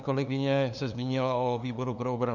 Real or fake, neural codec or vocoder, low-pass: fake; codec, 16 kHz, 2 kbps, FunCodec, trained on LibriTTS, 25 frames a second; 7.2 kHz